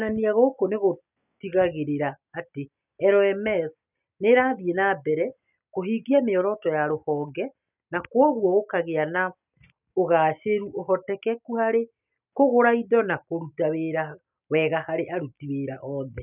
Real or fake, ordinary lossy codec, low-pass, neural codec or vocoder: real; none; 3.6 kHz; none